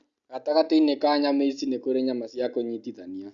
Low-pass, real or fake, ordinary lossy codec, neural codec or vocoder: 7.2 kHz; real; Opus, 64 kbps; none